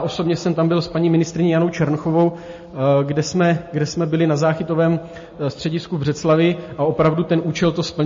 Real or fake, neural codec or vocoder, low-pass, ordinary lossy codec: real; none; 7.2 kHz; MP3, 32 kbps